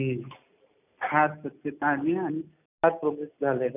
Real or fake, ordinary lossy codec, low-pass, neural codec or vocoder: real; none; 3.6 kHz; none